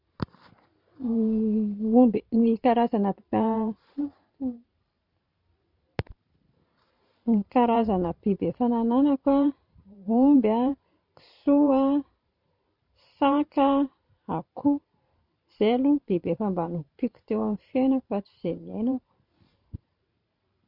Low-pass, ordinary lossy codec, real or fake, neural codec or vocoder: 5.4 kHz; none; fake; vocoder, 44.1 kHz, 128 mel bands every 512 samples, BigVGAN v2